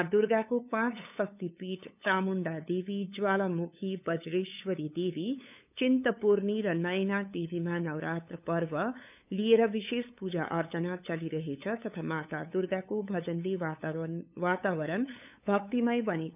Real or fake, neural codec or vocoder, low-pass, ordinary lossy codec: fake; codec, 16 kHz, 8 kbps, FunCodec, trained on LibriTTS, 25 frames a second; 3.6 kHz; none